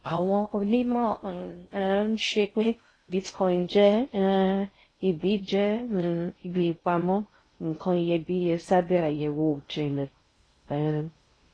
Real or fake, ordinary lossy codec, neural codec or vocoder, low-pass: fake; AAC, 32 kbps; codec, 16 kHz in and 24 kHz out, 0.6 kbps, FocalCodec, streaming, 2048 codes; 9.9 kHz